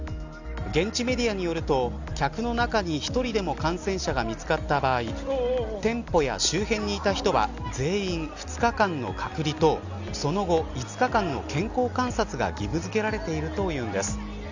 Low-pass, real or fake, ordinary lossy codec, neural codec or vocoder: 7.2 kHz; real; Opus, 64 kbps; none